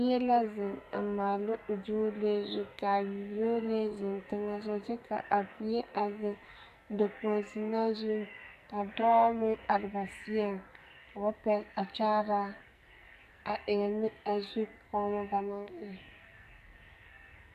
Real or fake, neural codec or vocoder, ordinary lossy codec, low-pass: fake; codec, 44.1 kHz, 2.6 kbps, SNAC; AAC, 96 kbps; 14.4 kHz